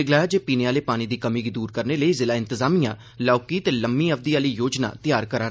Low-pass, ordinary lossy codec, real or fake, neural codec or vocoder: none; none; real; none